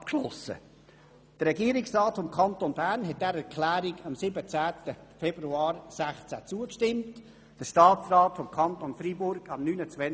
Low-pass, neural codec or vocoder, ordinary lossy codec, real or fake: none; none; none; real